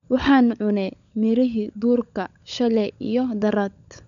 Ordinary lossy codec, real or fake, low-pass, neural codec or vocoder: none; fake; 7.2 kHz; codec, 16 kHz, 16 kbps, FunCodec, trained on LibriTTS, 50 frames a second